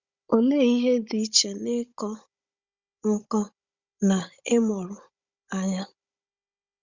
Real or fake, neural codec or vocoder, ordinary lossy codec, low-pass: fake; codec, 16 kHz, 16 kbps, FunCodec, trained on Chinese and English, 50 frames a second; Opus, 64 kbps; 7.2 kHz